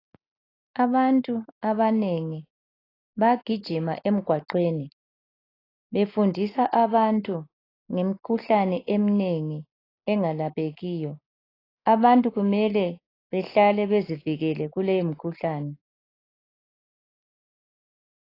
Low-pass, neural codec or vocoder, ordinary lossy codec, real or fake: 5.4 kHz; none; AAC, 24 kbps; real